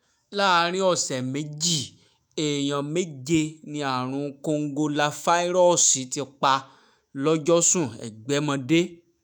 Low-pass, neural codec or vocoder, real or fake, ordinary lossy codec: none; autoencoder, 48 kHz, 128 numbers a frame, DAC-VAE, trained on Japanese speech; fake; none